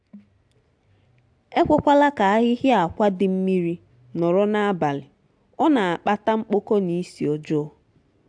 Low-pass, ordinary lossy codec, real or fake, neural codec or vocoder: 9.9 kHz; AAC, 64 kbps; real; none